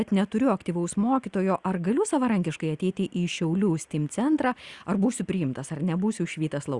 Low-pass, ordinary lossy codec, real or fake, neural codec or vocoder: 10.8 kHz; Opus, 64 kbps; real; none